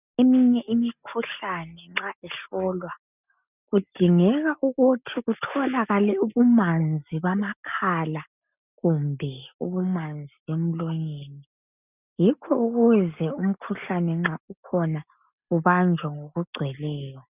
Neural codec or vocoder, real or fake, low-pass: none; real; 3.6 kHz